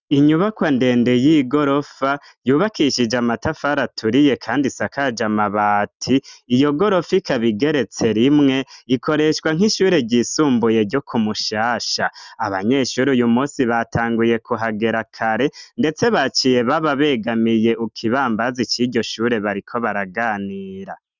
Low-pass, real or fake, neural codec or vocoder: 7.2 kHz; real; none